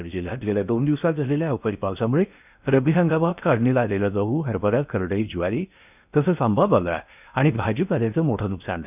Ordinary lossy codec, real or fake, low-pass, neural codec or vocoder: none; fake; 3.6 kHz; codec, 16 kHz in and 24 kHz out, 0.6 kbps, FocalCodec, streaming, 2048 codes